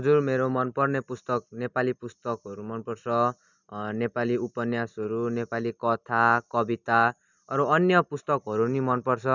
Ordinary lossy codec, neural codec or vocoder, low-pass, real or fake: none; none; 7.2 kHz; real